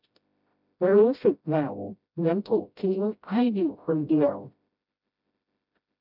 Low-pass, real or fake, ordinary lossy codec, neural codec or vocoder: 5.4 kHz; fake; none; codec, 16 kHz, 0.5 kbps, FreqCodec, smaller model